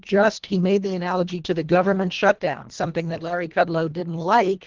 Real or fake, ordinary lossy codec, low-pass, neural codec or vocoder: fake; Opus, 16 kbps; 7.2 kHz; codec, 24 kHz, 1.5 kbps, HILCodec